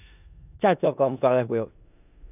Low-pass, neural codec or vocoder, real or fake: 3.6 kHz; codec, 16 kHz in and 24 kHz out, 0.4 kbps, LongCat-Audio-Codec, four codebook decoder; fake